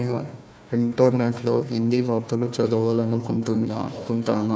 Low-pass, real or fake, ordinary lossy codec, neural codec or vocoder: none; fake; none; codec, 16 kHz, 1 kbps, FunCodec, trained on Chinese and English, 50 frames a second